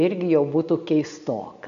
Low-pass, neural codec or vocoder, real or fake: 7.2 kHz; none; real